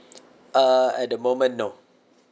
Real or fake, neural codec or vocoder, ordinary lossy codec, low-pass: real; none; none; none